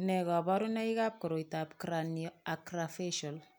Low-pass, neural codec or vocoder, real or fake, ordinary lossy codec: none; none; real; none